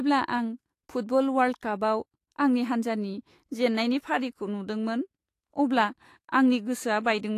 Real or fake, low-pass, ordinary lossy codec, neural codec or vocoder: fake; 14.4 kHz; AAC, 48 kbps; autoencoder, 48 kHz, 128 numbers a frame, DAC-VAE, trained on Japanese speech